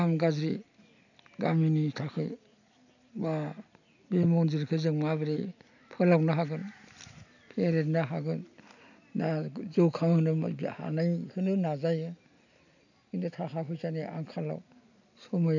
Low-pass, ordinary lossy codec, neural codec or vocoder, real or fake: 7.2 kHz; none; none; real